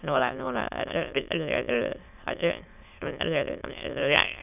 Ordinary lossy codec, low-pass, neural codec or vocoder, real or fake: none; 3.6 kHz; autoencoder, 22.05 kHz, a latent of 192 numbers a frame, VITS, trained on many speakers; fake